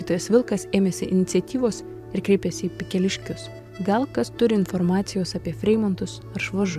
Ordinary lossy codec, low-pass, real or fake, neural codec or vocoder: AAC, 96 kbps; 14.4 kHz; fake; vocoder, 44.1 kHz, 128 mel bands every 512 samples, BigVGAN v2